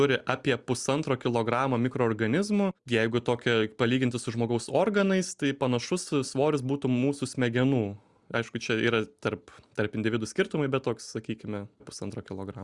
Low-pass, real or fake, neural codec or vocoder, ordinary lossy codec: 10.8 kHz; real; none; Opus, 64 kbps